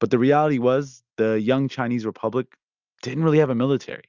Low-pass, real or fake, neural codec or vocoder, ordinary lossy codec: 7.2 kHz; real; none; Opus, 64 kbps